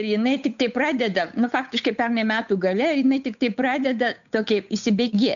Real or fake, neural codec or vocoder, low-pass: fake; codec, 16 kHz, 8 kbps, FunCodec, trained on Chinese and English, 25 frames a second; 7.2 kHz